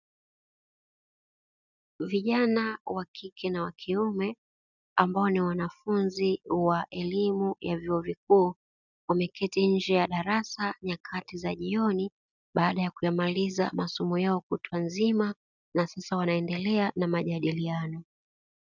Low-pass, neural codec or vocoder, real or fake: 7.2 kHz; none; real